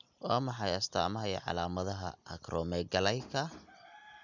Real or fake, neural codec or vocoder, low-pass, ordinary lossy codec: real; none; 7.2 kHz; none